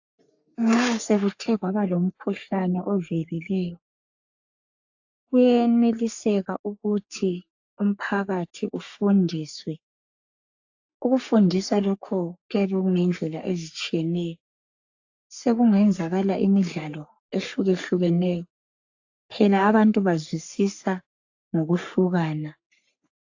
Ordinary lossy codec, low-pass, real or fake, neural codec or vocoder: AAC, 48 kbps; 7.2 kHz; fake; codec, 44.1 kHz, 3.4 kbps, Pupu-Codec